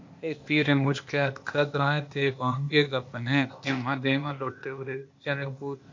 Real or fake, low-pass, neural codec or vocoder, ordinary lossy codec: fake; 7.2 kHz; codec, 16 kHz, 0.8 kbps, ZipCodec; MP3, 48 kbps